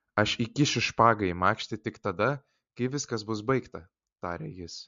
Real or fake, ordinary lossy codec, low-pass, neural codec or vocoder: real; MP3, 48 kbps; 7.2 kHz; none